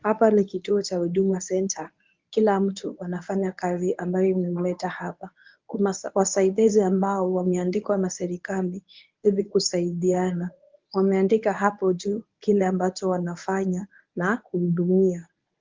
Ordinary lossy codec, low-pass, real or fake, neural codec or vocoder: Opus, 32 kbps; 7.2 kHz; fake; codec, 24 kHz, 0.9 kbps, WavTokenizer, medium speech release version 1